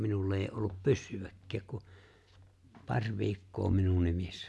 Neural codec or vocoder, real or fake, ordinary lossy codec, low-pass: none; real; none; none